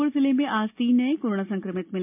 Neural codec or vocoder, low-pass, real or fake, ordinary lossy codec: none; 3.6 kHz; real; MP3, 32 kbps